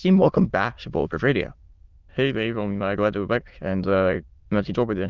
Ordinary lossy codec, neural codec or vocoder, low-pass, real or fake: Opus, 32 kbps; autoencoder, 22.05 kHz, a latent of 192 numbers a frame, VITS, trained on many speakers; 7.2 kHz; fake